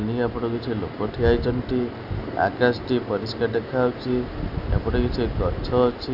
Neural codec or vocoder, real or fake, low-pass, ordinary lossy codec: none; real; 5.4 kHz; none